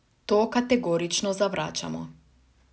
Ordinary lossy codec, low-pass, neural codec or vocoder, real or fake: none; none; none; real